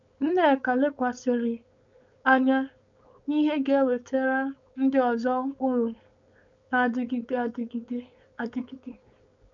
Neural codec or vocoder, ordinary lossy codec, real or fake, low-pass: codec, 16 kHz, 4.8 kbps, FACodec; none; fake; 7.2 kHz